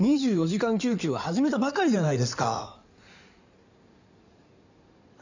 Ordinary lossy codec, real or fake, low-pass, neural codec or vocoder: none; fake; 7.2 kHz; codec, 16 kHz in and 24 kHz out, 2.2 kbps, FireRedTTS-2 codec